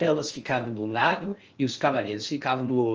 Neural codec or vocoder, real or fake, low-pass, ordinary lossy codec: codec, 16 kHz in and 24 kHz out, 0.6 kbps, FocalCodec, streaming, 4096 codes; fake; 7.2 kHz; Opus, 24 kbps